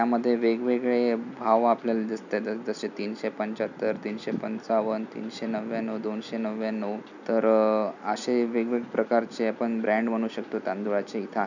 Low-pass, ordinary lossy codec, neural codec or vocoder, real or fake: 7.2 kHz; none; none; real